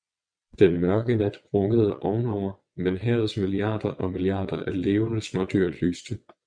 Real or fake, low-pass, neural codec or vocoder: fake; 9.9 kHz; vocoder, 22.05 kHz, 80 mel bands, WaveNeXt